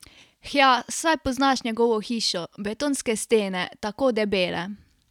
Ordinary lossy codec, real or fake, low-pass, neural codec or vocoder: none; real; 19.8 kHz; none